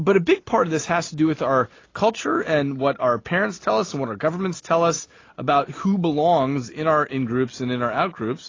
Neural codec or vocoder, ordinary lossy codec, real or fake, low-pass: none; AAC, 32 kbps; real; 7.2 kHz